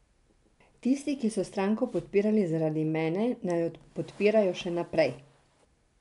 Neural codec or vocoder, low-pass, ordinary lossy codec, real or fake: none; 10.8 kHz; none; real